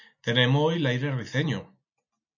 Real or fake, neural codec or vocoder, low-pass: real; none; 7.2 kHz